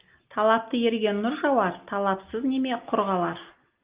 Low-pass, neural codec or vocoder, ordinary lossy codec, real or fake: 3.6 kHz; none; Opus, 32 kbps; real